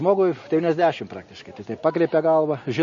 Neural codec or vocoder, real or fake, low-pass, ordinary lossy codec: none; real; 7.2 kHz; MP3, 32 kbps